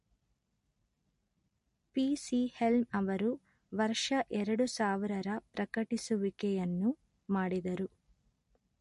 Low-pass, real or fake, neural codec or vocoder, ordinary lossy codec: 14.4 kHz; real; none; MP3, 48 kbps